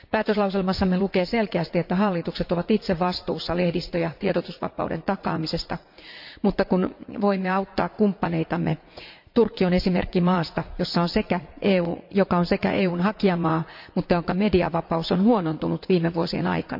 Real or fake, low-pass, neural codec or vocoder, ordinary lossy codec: fake; 5.4 kHz; vocoder, 44.1 kHz, 80 mel bands, Vocos; MP3, 48 kbps